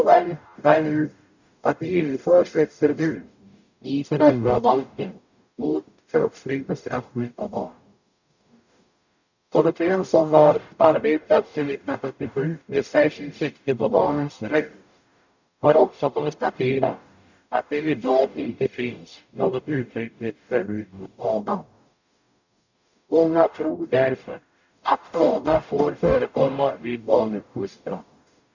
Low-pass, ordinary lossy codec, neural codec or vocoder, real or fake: 7.2 kHz; none; codec, 44.1 kHz, 0.9 kbps, DAC; fake